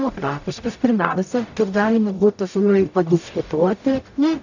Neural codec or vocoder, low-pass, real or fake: codec, 44.1 kHz, 0.9 kbps, DAC; 7.2 kHz; fake